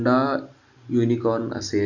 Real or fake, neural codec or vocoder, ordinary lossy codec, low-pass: real; none; none; 7.2 kHz